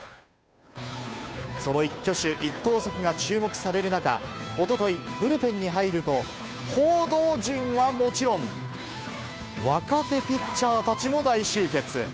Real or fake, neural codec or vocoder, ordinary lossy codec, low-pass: fake; codec, 16 kHz, 2 kbps, FunCodec, trained on Chinese and English, 25 frames a second; none; none